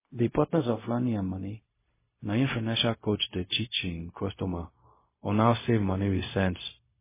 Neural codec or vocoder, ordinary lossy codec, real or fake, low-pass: codec, 16 kHz, 0.4 kbps, LongCat-Audio-Codec; MP3, 16 kbps; fake; 3.6 kHz